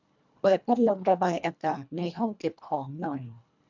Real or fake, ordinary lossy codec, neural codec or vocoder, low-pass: fake; none; codec, 24 kHz, 1.5 kbps, HILCodec; 7.2 kHz